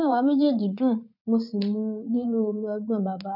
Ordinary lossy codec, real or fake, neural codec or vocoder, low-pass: none; fake; vocoder, 44.1 kHz, 80 mel bands, Vocos; 5.4 kHz